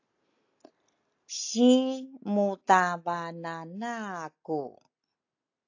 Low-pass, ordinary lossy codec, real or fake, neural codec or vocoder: 7.2 kHz; AAC, 48 kbps; real; none